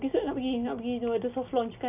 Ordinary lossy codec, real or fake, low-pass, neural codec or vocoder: none; real; 3.6 kHz; none